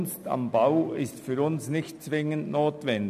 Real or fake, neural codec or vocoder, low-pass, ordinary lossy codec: real; none; 14.4 kHz; none